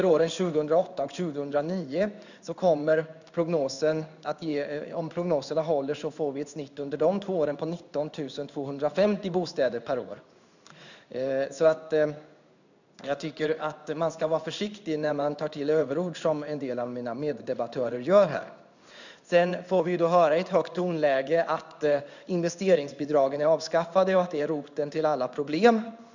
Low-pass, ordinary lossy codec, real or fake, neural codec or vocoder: 7.2 kHz; none; fake; codec, 16 kHz in and 24 kHz out, 1 kbps, XY-Tokenizer